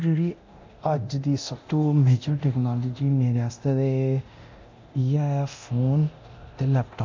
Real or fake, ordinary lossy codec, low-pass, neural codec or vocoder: fake; MP3, 64 kbps; 7.2 kHz; codec, 24 kHz, 0.9 kbps, DualCodec